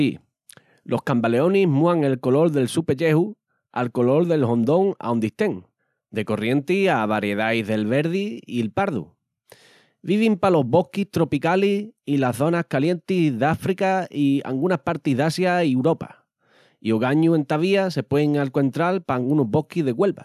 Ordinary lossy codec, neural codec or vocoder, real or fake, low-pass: none; none; real; 14.4 kHz